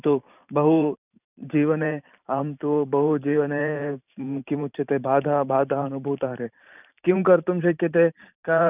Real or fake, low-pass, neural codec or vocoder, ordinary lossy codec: fake; 3.6 kHz; vocoder, 44.1 kHz, 128 mel bands every 512 samples, BigVGAN v2; none